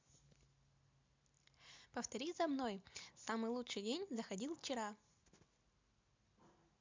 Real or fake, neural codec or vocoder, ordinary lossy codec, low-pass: real; none; MP3, 64 kbps; 7.2 kHz